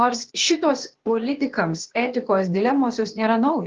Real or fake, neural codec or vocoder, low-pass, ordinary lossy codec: fake; codec, 16 kHz, 0.8 kbps, ZipCodec; 7.2 kHz; Opus, 16 kbps